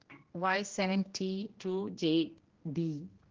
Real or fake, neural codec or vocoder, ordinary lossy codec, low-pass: fake; codec, 16 kHz, 1 kbps, X-Codec, HuBERT features, trained on general audio; Opus, 16 kbps; 7.2 kHz